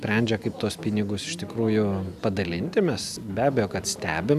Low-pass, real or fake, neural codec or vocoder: 14.4 kHz; real; none